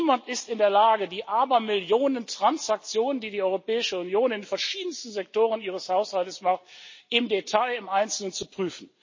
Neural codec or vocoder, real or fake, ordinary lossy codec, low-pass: none; real; MP3, 32 kbps; 7.2 kHz